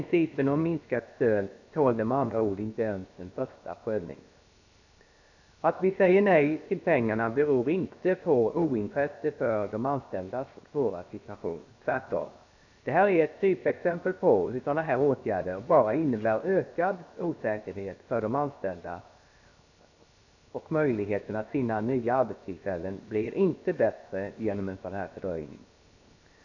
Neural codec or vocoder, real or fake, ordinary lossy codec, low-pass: codec, 16 kHz, 0.7 kbps, FocalCodec; fake; none; 7.2 kHz